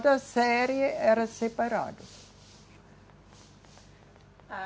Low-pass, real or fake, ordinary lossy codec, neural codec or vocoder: none; real; none; none